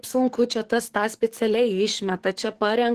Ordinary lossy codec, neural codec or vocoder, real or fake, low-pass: Opus, 16 kbps; codec, 44.1 kHz, 7.8 kbps, DAC; fake; 14.4 kHz